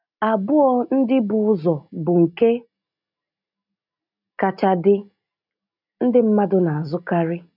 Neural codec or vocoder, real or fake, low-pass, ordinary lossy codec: none; real; 5.4 kHz; none